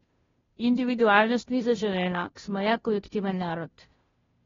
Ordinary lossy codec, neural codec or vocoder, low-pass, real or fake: AAC, 24 kbps; codec, 16 kHz, 0.5 kbps, FunCodec, trained on Chinese and English, 25 frames a second; 7.2 kHz; fake